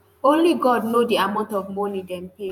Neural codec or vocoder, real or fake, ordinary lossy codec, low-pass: vocoder, 48 kHz, 128 mel bands, Vocos; fake; none; 19.8 kHz